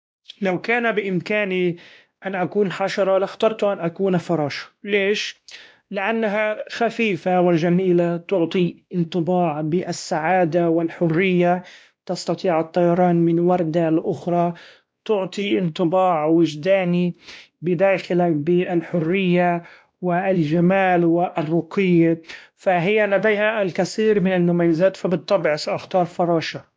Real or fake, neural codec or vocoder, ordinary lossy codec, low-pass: fake; codec, 16 kHz, 1 kbps, X-Codec, WavLM features, trained on Multilingual LibriSpeech; none; none